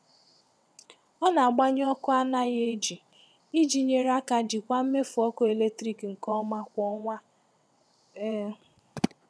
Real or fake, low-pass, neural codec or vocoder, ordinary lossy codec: fake; none; vocoder, 22.05 kHz, 80 mel bands, WaveNeXt; none